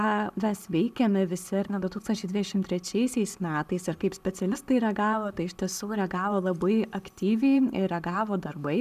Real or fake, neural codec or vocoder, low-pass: real; none; 14.4 kHz